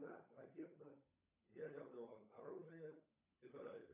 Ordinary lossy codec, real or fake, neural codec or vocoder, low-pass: AAC, 32 kbps; fake; codec, 16 kHz, 16 kbps, FunCodec, trained on LibriTTS, 50 frames a second; 3.6 kHz